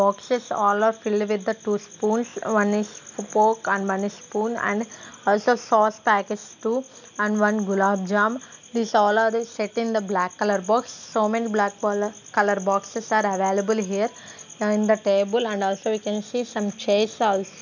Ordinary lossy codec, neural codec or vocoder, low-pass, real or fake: none; none; 7.2 kHz; real